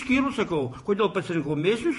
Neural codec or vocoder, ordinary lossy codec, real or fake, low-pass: vocoder, 48 kHz, 128 mel bands, Vocos; MP3, 48 kbps; fake; 14.4 kHz